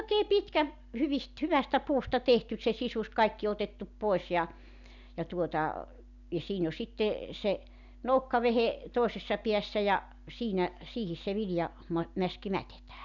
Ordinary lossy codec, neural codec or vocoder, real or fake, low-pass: none; none; real; 7.2 kHz